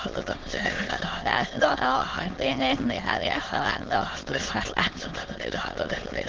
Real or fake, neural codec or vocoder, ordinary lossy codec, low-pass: fake; autoencoder, 22.05 kHz, a latent of 192 numbers a frame, VITS, trained on many speakers; Opus, 16 kbps; 7.2 kHz